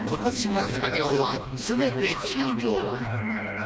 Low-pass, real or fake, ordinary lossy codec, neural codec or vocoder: none; fake; none; codec, 16 kHz, 1 kbps, FreqCodec, smaller model